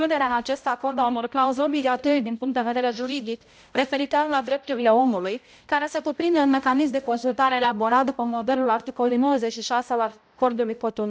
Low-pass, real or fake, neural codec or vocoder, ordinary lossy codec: none; fake; codec, 16 kHz, 0.5 kbps, X-Codec, HuBERT features, trained on balanced general audio; none